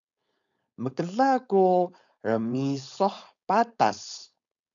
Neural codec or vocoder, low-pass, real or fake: codec, 16 kHz, 4.8 kbps, FACodec; 7.2 kHz; fake